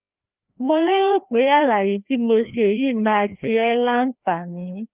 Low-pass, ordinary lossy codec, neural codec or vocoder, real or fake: 3.6 kHz; Opus, 32 kbps; codec, 16 kHz, 1 kbps, FreqCodec, larger model; fake